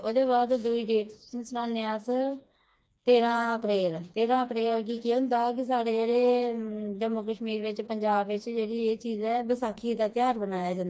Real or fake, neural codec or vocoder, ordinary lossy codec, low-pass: fake; codec, 16 kHz, 2 kbps, FreqCodec, smaller model; none; none